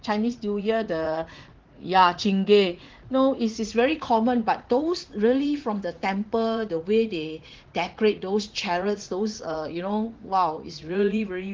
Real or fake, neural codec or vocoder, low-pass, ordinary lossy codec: fake; vocoder, 44.1 kHz, 80 mel bands, Vocos; 7.2 kHz; Opus, 16 kbps